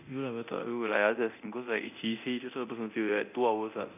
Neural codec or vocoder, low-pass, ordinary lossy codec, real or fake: codec, 24 kHz, 0.9 kbps, DualCodec; 3.6 kHz; none; fake